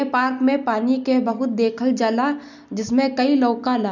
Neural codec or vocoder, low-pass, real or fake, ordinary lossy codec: none; 7.2 kHz; real; none